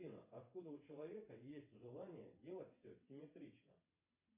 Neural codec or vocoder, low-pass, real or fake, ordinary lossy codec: vocoder, 22.05 kHz, 80 mel bands, WaveNeXt; 3.6 kHz; fake; MP3, 24 kbps